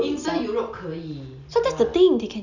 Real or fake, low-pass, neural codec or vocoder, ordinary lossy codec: real; 7.2 kHz; none; none